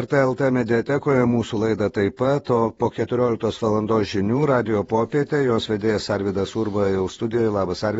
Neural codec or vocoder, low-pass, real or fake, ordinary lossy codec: vocoder, 48 kHz, 128 mel bands, Vocos; 19.8 kHz; fake; AAC, 24 kbps